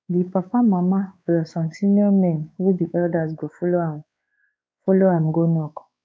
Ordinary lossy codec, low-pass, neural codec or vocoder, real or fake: none; none; codec, 16 kHz, 4 kbps, X-Codec, WavLM features, trained on Multilingual LibriSpeech; fake